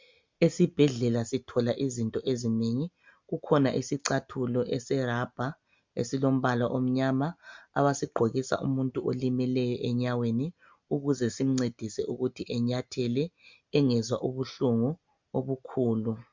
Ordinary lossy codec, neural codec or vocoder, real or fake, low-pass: MP3, 64 kbps; none; real; 7.2 kHz